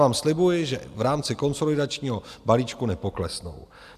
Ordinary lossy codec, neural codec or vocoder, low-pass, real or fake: AAC, 96 kbps; none; 14.4 kHz; real